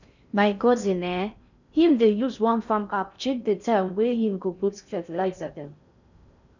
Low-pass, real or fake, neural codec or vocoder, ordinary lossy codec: 7.2 kHz; fake; codec, 16 kHz in and 24 kHz out, 0.6 kbps, FocalCodec, streaming, 4096 codes; none